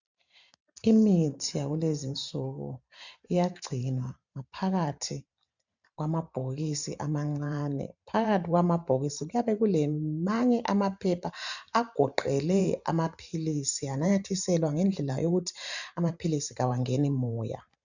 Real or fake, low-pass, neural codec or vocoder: fake; 7.2 kHz; vocoder, 44.1 kHz, 128 mel bands every 512 samples, BigVGAN v2